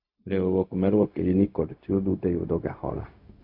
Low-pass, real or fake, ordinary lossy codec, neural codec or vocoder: 5.4 kHz; fake; AAC, 48 kbps; codec, 16 kHz, 0.4 kbps, LongCat-Audio-Codec